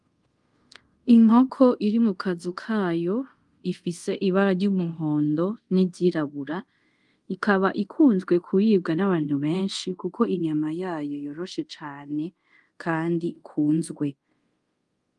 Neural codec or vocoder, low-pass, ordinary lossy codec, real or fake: codec, 24 kHz, 0.5 kbps, DualCodec; 10.8 kHz; Opus, 24 kbps; fake